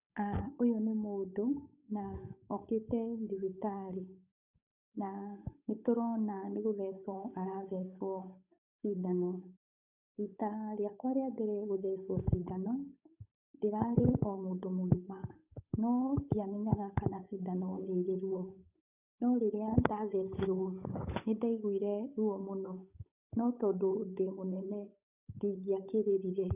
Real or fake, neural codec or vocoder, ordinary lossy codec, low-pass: fake; codec, 16 kHz, 8 kbps, FunCodec, trained on Chinese and English, 25 frames a second; none; 3.6 kHz